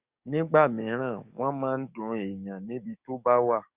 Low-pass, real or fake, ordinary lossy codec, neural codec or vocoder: 3.6 kHz; fake; Opus, 64 kbps; codec, 44.1 kHz, 7.8 kbps, DAC